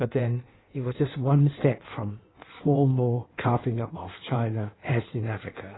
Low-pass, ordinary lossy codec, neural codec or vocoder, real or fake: 7.2 kHz; AAC, 16 kbps; codec, 16 kHz in and 24 kHz out, 1.1 kbps, FireRedTTS-2 codec; fake